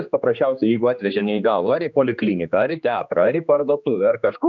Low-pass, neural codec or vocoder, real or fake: 7.2 kHz; codec, 16 kHz, 2 kbps, X-Codec, HuBERT features, trained on general audio; fake